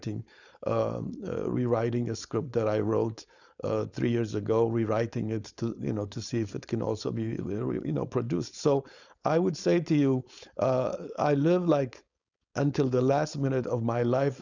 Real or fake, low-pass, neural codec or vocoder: fake; 7.2 kHz; codec, 16 kHz, 4.8 kbps, FACodec